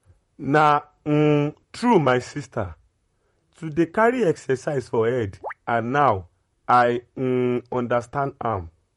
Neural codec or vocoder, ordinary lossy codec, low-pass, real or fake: vocoder, 44.1 kHz, 128 mel bands, Pupu-Vocoder; MP3, 48 kbps; 19.8 kHz; fake